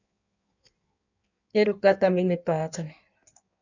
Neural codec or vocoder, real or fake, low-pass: codec, 16 kHz in and 24 kHz out, 1.1 kbps, FireRedTTS-2 codec; fake; 7.2 kHz